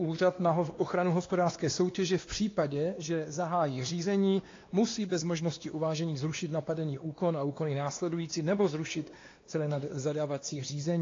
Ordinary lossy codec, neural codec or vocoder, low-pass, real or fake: AAC, 32 kbps; codec, 16 kHz, 2 kbps, X-Codec, WavLM features, trained on Multilingual LibriSpeech; 7.2 kHz; fake